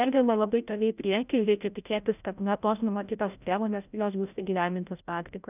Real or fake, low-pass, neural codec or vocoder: fake; 3.6 kHz; codec, 16 kHz, 0.5 kbps, FreqCodec, larger model